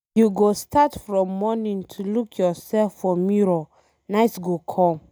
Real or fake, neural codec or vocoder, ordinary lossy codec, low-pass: real; none; none; none